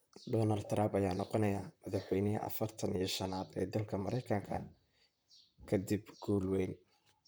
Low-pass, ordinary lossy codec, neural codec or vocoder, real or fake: none; none; vocoder, 44.1 kHz, 128 mel bands, Pupu-Vocoder; fake